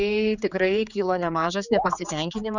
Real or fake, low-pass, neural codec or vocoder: fake; 7.2 kHz; codec, 16 kHz, 4 kbps, X-Codec, HuBERT features, trained on general audio